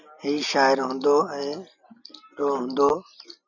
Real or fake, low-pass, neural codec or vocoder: real; 7.2 kHz; none